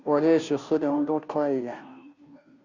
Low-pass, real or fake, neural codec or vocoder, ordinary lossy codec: 7.2 kHz; fake; codec, 16 kHz, 0.5 kbps, FunCodec, trained on Chinese and English, 25 frames a second; Opus, 64 kbps